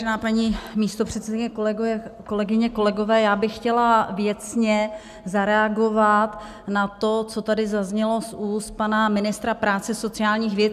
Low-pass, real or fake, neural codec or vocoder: 14.4 kHz; real; none